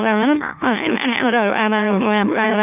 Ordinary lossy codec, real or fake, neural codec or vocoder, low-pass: none; fake; autoencoder, 44.1 kHz, a latent of 192 numbers a frame, MeloTTS; 3.6 kHz